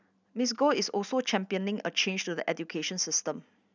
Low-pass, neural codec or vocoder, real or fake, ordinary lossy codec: 7.2 kHz; none; real; none